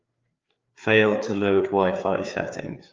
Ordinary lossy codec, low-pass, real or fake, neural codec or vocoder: Opus, 32 kbps; 7.2 kHz; fake; codec, 16 kHz, 4 kbps, FreqCodec, larger model